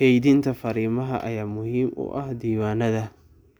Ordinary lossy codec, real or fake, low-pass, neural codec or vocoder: none; real; none; none